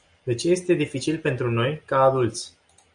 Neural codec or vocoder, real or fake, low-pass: none; real; 9.9 kHz